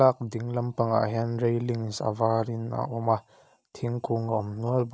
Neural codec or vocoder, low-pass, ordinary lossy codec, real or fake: none; none; none; real